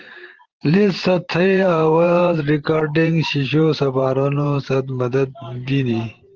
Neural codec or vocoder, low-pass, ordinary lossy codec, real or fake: vocoder, 44.1 kHz, 128 mel bands every 512 samples, BigVGAN v2; 7.2 kHz; Opus, 16 kbps; fake